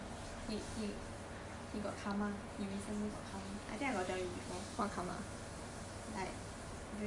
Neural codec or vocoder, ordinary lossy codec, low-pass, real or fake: none; MP3, 64 kbps; 10.8 kHz; real